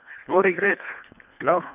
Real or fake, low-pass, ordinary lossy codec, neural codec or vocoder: fake; 3.6 kHz; none; codec, 24 kHz, 1.5 kbps, HILCodec